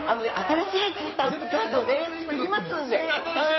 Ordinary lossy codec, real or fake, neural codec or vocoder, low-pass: MP3, 24 kbps; fake; codec, 16 kHz in and 24 kHz out, 2.2 kbps, FireRedTTS-2 codec; 7.2 kHz